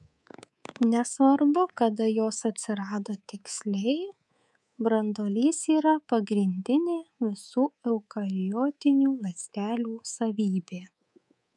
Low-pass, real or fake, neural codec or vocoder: 10.8 kHz; fake; codec, 24 kHz, 3.1 kbps, DualCodec